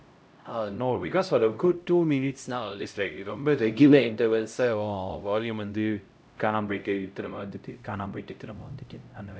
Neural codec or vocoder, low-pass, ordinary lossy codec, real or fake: codec, 16 kHz, 0.5 kbps, X-Codec, HuBERT features, trained on LibriSpeech; none; none; fake